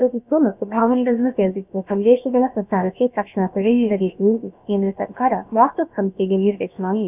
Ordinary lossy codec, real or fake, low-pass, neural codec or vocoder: none; fake; 3.6 kHz; codec, 16 kHz, about 1 kbps, DyCAST, with the encoder's durations